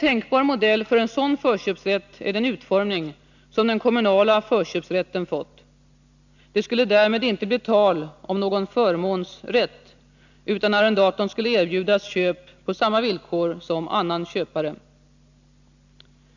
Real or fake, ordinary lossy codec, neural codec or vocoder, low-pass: real; none; none; 7.2 kHz